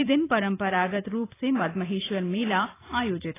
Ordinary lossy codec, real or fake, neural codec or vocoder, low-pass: AAC, 16 kbps; fake; vocoder, 44.1 kHz, 128 mel bands every 256 samples, BigVGAN v2; 3.6 kHz